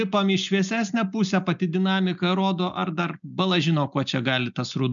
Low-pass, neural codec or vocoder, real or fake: 7.2 kHz; none; real